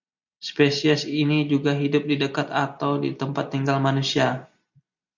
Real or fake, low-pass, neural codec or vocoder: real; 7.2 kHz; none